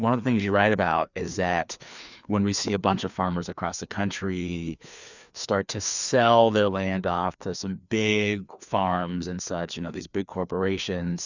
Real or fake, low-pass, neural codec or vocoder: fake; 7.2 kHz; codec, 16 kHz, 2 kbps, FreqCodec, larger model